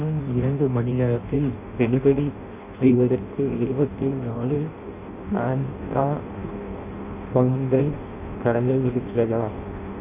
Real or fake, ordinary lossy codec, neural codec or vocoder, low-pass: fake; none; codec, 16 kHz in and 24 kHz out, 0.6 kbps, FireRedTTS-2 codec; 3.6 kHz